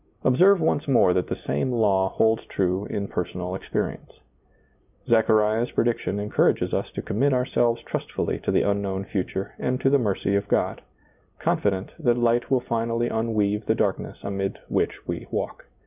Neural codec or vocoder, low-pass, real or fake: none; 3.6 kHz; real